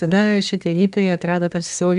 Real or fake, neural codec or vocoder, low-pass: fake; codec, 24 kHz, 1 kbps, SNAC; 10.8 kHz